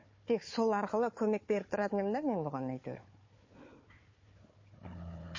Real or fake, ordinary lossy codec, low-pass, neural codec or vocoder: fake; MP3, 32 kbps; 7.2 kHz; codec, 16 kHz, 4 kbps, FunCodec, trained on Chinese and English, 50 frames a second